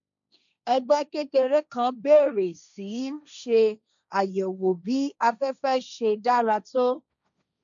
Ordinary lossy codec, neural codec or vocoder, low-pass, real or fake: none; codec, 16 kHz, 1.1 kbps, Voila-Tokenizer; 7.2 kHz; fake